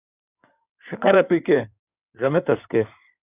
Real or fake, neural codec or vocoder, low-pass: fake; codec, 16 kHz in and 24 kHz out, 2.2 kbps, FireRedTTS-2 codec; 3.6 kHz